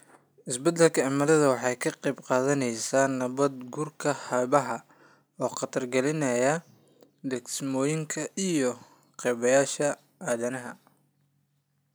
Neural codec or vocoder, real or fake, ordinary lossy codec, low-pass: none; real; none; none